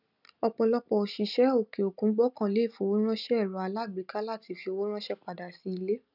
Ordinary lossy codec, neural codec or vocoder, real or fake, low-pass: none; none; real; 5.4 kHz